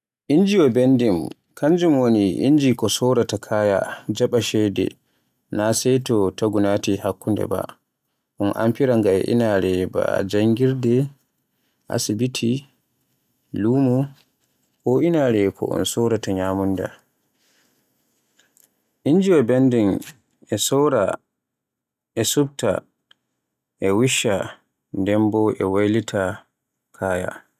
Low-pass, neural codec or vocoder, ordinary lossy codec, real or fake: 14.4 kHz; none; none; real